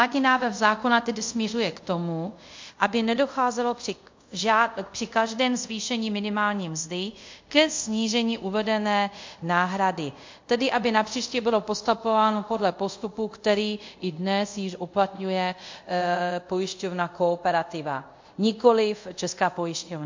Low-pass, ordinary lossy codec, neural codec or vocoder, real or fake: 7.2 kHz; MP3, 48 kbps; codec, 24 kHz, 0.5 kbps, DualCodec; fake